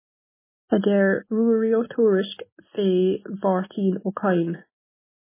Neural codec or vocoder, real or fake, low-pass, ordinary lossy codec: none; real; 3.6 kHz; MP3, 16 kbps